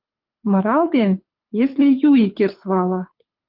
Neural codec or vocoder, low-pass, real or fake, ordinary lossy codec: codec, 24 kHz, 6 kbps, HILCodec; 5.4 kHz; fake; Opus, 32 kbps